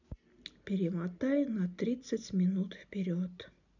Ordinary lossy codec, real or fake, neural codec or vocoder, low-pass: none; real; none; 7.2 kHz